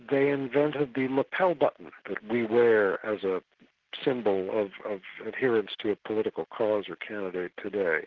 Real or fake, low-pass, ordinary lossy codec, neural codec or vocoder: real; 7.2 kHz; Opus, 16 kbps; none